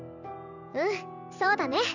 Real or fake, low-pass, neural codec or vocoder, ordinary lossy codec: fake; 7.2 kHz; vocoder, 44.1 kHz, 128 mel bands every 256 samples, BigVGAN v2; none